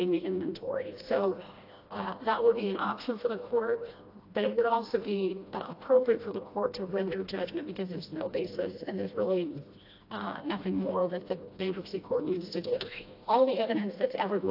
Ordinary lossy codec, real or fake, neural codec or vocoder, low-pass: AAC, 32 kbps; fake; codec, 16 kHz, 1 kbps, FreqCodec, smaller model; 5.4 kHz